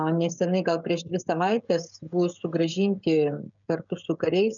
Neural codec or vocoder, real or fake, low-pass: codec, 16 kHz, 16 kbps, FreqCodec, smaller model; fake; 7.2 kHz